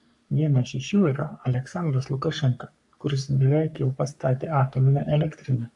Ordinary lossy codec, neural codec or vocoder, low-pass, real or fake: AAC, 64 kbps; codec, 44.1 kHz, 3.4 kbps, Pupu-Codec; 10.8 kHz; fake